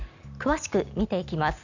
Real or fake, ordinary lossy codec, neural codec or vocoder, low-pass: real; none; none; 7.2 kHz